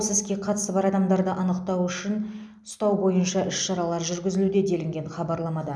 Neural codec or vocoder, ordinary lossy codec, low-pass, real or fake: none; none; none; real